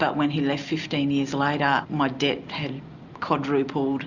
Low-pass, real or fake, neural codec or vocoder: 7.2 kHz; real; none